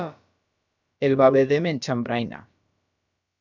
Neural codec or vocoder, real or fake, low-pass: codec, 16 kHz, about 1 kbps, DyCAST, with the encoder's durations; fake; 7.2 kHz